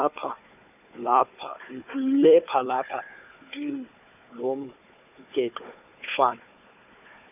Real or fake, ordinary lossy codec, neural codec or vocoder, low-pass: fake; none; codec, 16 kHz, 4 kbps, FunCodec, trained on Chinese and English, 50 frames a second; 3.6 kHz